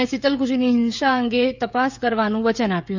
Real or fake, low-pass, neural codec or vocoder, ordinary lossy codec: fake; 7.2 kHz; codec, 16 kHz, 16 kbps, FreqCodec, smaller model; none